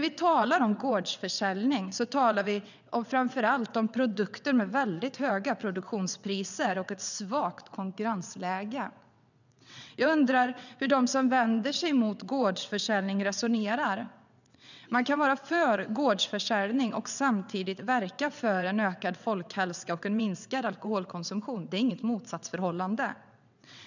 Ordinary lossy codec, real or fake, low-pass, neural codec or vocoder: none; fake; 7.2 kHz; vocoder, 22.05 kHz, 80 mel bands, WaveNeXt